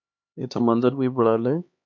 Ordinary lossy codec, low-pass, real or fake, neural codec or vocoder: MP3, 48 kbps; 7.2 kHz; fake; codec, 16 kHz, 2 kbps, X-Codec, HuBERT features, trained on LibriSpeech